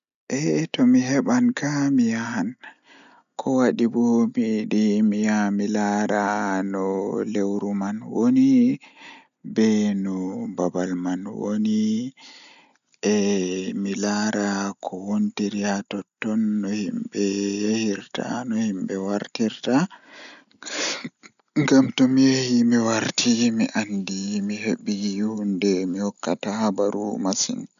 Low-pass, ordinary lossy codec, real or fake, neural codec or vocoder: 7.2 kHz; none; real; none